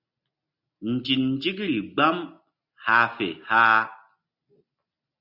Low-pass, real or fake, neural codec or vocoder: 5.4 kHz; real; none